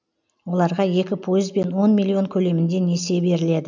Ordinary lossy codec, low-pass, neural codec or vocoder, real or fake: none; 7.2 kHz; none; real